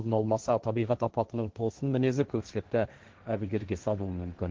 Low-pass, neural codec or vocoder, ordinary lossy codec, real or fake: 7.2 kHz; codec, 16 kHz, 1.1 kbps, Voila-Tokenizer; Opus, 16 kbps; fake